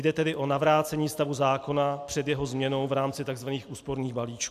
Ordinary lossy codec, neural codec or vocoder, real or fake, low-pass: AAC, 96 kbps; none; real; 14.4 kHz